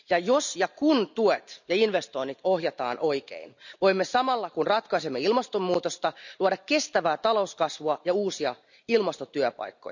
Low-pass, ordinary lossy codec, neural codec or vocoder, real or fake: 7.2 kHz; none; none; real